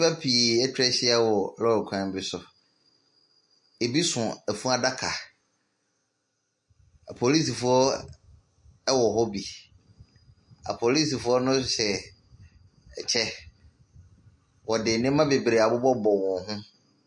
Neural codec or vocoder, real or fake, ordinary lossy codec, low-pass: none; real; MP3, 48 kbps; 10.8 kHz